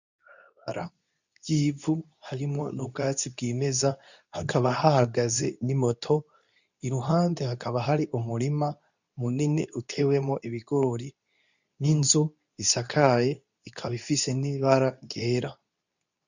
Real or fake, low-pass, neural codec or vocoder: fake; 7.2 kHz; codec, 24 kHz, 0.9 kbps, WavTokenizer, medium speech release version 2